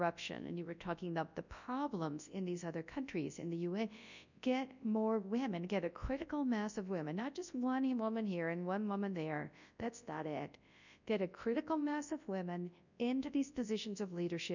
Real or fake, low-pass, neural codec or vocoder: fake; 7.2 kHz; codec, 24 kHz, 0.9 kbps, WavTokenizer, large speech release